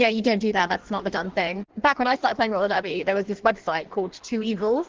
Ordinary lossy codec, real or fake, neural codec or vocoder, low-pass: Opus, 16 kbps; fake; codec, 16 kHz in and 24 kHz out, 1.1 kbps, FireRedTTS-2 codec; 7.2 kHz